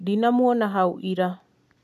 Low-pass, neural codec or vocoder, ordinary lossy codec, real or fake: 14.4 kHz; none; none; real